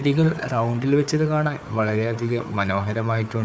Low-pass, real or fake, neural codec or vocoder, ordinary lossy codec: none; fake; codec, 16 kHz, 4 kbps, FunCodec, trained on Chinese and English, 50 frames a second; none